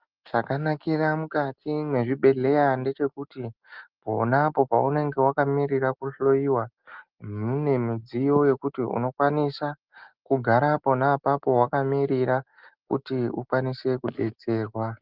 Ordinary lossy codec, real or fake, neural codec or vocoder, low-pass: Opus, 32 kbps; real; none; 5.4 kHz